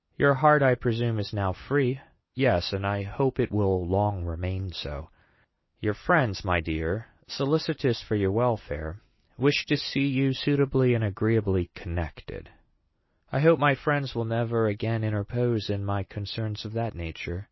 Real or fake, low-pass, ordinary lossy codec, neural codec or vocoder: real; 7.2 kHz; MP3, 24 kbps; none